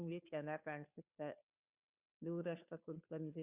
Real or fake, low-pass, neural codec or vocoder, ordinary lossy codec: fake; 3.6 kHz; codec, 16 kHz, 2 kbps, FunCodec, trained on Chinese and English, 25 frames a second; Opus, 64 kbps